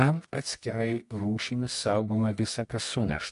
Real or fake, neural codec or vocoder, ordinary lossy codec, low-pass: fake; codec, 24 kHz, 0.9 kbps, WavTokenizer, medium music audio release; MP3, 48 kbps; 10.8 kHz